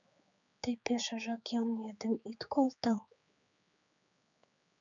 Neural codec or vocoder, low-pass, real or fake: codec, 16 kHz, 4 kbps, X-Codec, HuBERT features, trained on general audio; 7.2 kHz; fake